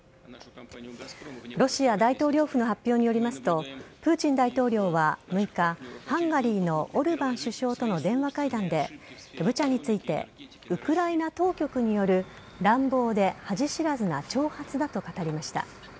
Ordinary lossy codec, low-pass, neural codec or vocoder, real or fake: none; none; none; real